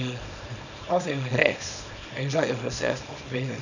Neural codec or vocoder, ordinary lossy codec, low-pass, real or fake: codec, 24 kHz, 0.9 kbps, WavTokenizer, small release; none; 7.2 kHz; fake